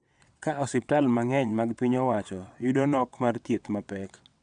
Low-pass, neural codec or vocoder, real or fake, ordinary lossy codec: 9.9 kHz; vocoder, 22.05 kHz, 80 mel bands, WaveNeXt; fake; none